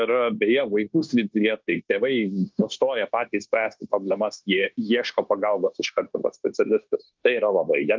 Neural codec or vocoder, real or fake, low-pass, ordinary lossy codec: codec, 16 kHz, 0.9 kbps, LongCat-Audio-Codec; fake; 7.2 kHz; Opus, 32 kbps